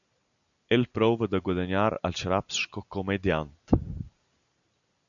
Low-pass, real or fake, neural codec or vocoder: 7.2 kHz; real; none